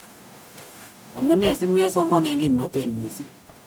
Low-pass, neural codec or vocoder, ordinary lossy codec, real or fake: none; codec, 44.1 kHz, 0.9 kbps, DAC; none; fake